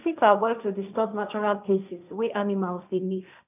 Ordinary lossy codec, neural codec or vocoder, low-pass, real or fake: none; codec, 16 kHz, 1.1 kbps, Voila-Tokenizer; 3.6 kHz; fake